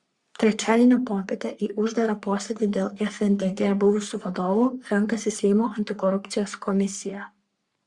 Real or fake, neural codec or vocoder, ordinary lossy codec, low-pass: fake; codec, 44.1 kHz, 3.4 kbps, Pupu-Codec; Opus, 64 kbps; 10.8 kHz